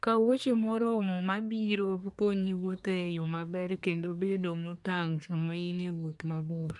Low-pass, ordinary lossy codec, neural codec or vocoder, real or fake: 10.8 kHz; none; codec, 24 kHz, 1 kbps, SNAC; fake